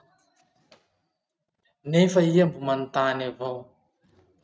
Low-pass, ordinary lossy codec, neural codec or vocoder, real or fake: none; none; none; real